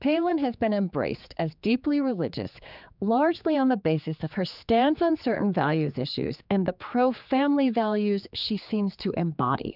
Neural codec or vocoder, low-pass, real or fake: codec, 16 kHz, 4 kbps, X-Codec, HuBERT features, trained on general audio; 5.4 kHz; fake